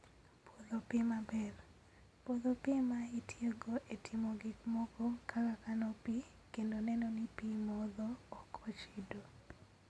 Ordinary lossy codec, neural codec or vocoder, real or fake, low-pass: none; none; real; none